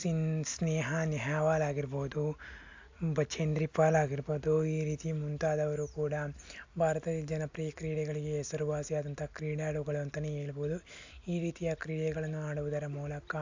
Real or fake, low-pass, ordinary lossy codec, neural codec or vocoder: real; 7.2 kHz; AAC, 48 kbps; none